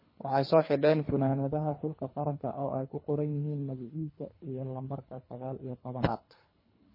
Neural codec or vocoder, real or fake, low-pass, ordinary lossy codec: codec, 24 kHz, 3 kbps, HILCodec; fake; 5.4 kHz; MP3, 24 kbps